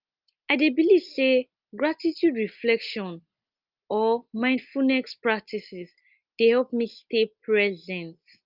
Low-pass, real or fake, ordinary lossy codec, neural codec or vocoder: 5.4 kHz; real; Opus, 24 kbps; none